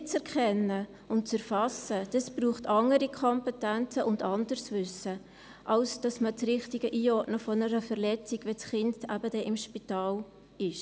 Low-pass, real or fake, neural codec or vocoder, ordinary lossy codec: none; real; none; none